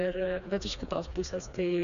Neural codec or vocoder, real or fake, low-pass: codec, 16 kHz, 2 kbps, FreqCodec, smaller model; fake; 7.2 kHz